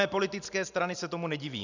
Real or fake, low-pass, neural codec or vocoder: real; 7.2 kHz; none